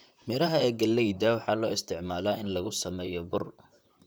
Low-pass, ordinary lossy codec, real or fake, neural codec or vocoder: none; none; fake; vocoder, 44.1 kHz, 128 mel bands, Pupu-Vocoder